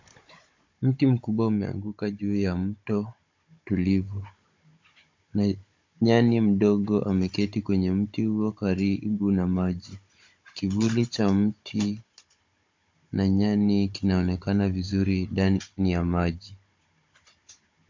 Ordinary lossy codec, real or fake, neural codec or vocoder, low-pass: MP3, 48 kbps; fake; codec, 16 kHz, 16 kbps, FunCodec, trained on Chinese and English, 50 frames a second; 7.2 kHz